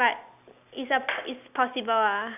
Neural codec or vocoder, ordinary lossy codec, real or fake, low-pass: none; none; real; 3.6 kHz